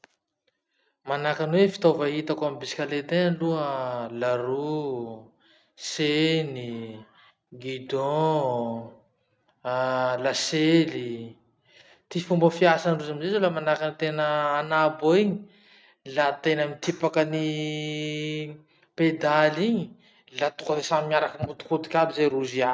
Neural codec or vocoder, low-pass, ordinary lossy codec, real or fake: none; none; none; real